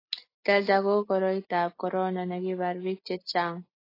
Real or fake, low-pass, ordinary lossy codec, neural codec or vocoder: real; 5.4 kHz; AAC, 24 kbps; none